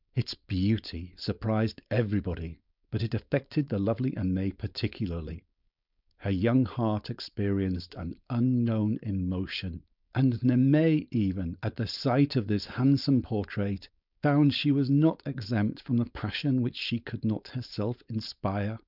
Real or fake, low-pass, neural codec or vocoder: fake; 5.4 kHz; codec, 16 kHz, 4.8 kbps, FACodec